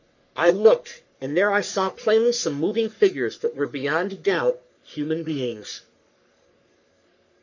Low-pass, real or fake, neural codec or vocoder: 7.2 kHz; fake; codec, 44.1 kHz, 3.4 kbps, Pupu-Codec